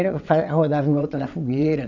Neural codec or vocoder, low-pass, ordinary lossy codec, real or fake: vocoder, 22.05 kHz, 80 mel bands, WaveNeXt; 7.2 kHz; none; fake